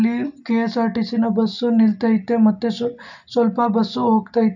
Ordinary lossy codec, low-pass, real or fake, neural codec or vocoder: none; 7.2 kHz; real; none